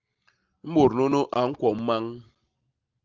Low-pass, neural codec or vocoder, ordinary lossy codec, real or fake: 7.2 kHz; none; Opus, 32 kbps; real